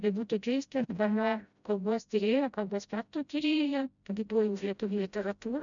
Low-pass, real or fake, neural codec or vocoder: 7.2 kHz; fake; codec, 16 kHz, 0.5 kbps, FreqCodec, smaller model